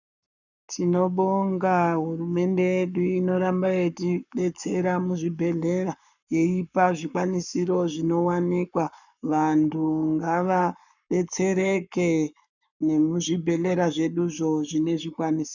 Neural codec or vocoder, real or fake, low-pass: codec, 44.1 kHz, 7.8 kbps, Pupu-Codec; fake; 7.2 kHz